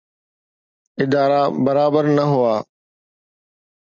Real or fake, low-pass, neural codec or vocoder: real; 7.2 kHz; none